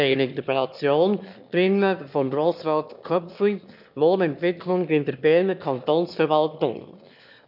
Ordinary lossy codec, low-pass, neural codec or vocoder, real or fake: none; 5.4 kHz; autoencoder, 22.05 kHz, a latent of 192 numbers a frame, VITS, trained on one speaker; fake